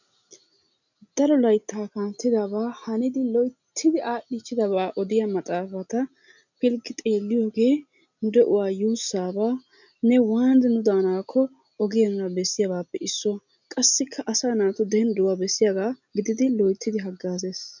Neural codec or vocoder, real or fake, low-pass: none; real; 7.2 kHz